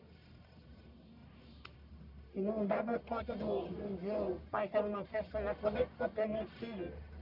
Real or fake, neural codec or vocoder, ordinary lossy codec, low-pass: fake; codec, 44.1 kHz, 1.7 kbps, Pupu-Codec; none; 5.4 kHz